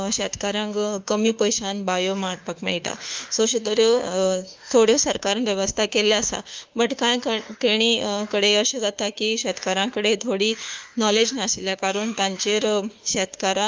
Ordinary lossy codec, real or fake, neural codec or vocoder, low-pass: Opus, 32 kbps; fake; autoencoder, 48 kHz, 32 numbers a frame, DAC-VAE, trained on Japanese speech; 7.2 kHz